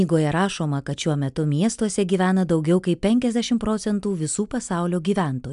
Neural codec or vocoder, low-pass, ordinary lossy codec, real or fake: none; 10.8 kHz; MP3, 96 kbps; real